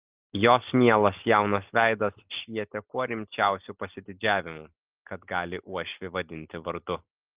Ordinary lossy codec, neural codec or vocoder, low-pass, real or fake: Opus, 32 kbps; none; 3.6 kHz; real